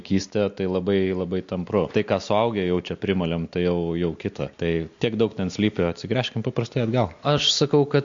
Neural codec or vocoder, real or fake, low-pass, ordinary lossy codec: none; real; 7.2 kHz; MP3, 48 kbps